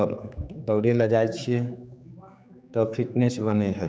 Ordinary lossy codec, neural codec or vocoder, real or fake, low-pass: none; codec, 16 kHz, 4 kbps, X-Codec, HuBERT features, trained on general audio; fake; none